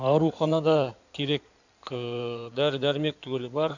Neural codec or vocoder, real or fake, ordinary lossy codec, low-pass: codec, 16 kHz in and 24 kHz out, 2.2 kbps, FireRedTTS-2 codec; fake; none; 7.2 kHz